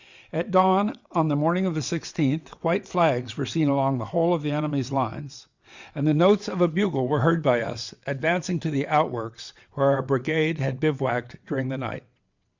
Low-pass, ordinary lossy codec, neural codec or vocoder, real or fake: 7.2 kHz; Opus, 64 kbps; vocoder, 22.05 kHz, 80 mel bands, WaveNeXt; fake